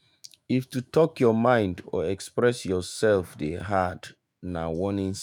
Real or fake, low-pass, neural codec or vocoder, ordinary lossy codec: fake; none; autoencoder, 48 kHz, 128 numbers a frame, DAC-VAE, trained on Japanese speech; none